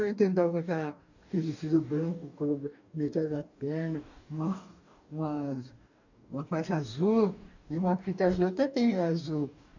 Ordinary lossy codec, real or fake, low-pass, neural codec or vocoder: none; fake; 7.2 kHz; codec, 44.1 kHz, 2.6 kbps, DAC